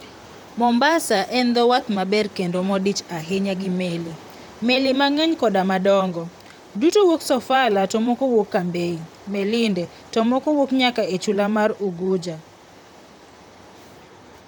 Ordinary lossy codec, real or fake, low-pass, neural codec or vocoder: none; fake; 19.8 kHz; vocoder, 44.1 kHz, 128 mel bands, Pupu-Vocoder